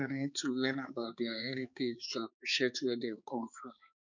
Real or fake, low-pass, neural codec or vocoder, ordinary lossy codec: fake; 7.2 kHz; codec, 16 kHz, 4 kbps, X-Codec, HuBERT features, trained on balanced general audio; none